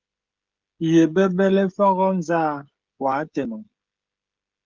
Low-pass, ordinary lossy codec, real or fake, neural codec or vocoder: 7.2 kHz; Opus, 32 kbps; fake; codec, 16 kHz, 16 kbps, FreqCodec, smaller model